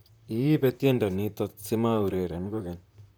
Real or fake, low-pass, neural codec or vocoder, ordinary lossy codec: fake; none; vocoder, 44.1 kHz, 128 mel bands, Pupu-Vocoder; none